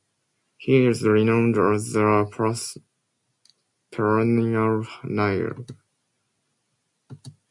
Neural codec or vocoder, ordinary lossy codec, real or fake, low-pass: none; MP3, 64 kbps; real; 10.8 kHz